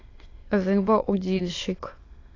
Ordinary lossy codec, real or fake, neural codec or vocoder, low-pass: AAC, 32 kbps; fake; autoencoder, 22.05 kHz, a latent of 192 numbers a frame, VITS, trained on many speakers; 7.2 kHz